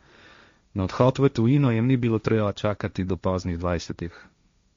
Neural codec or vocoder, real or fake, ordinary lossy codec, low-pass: codec, 16 kHz, 1.1 kbps, Voila-Tokenizer; fake; MP3, 48 kbps; 7.2 kHz